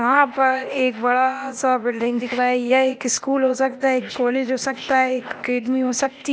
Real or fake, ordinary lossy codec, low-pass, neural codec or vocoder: fake; none; none; codec, 16 kHz, 0.8 kbps, ZipCodec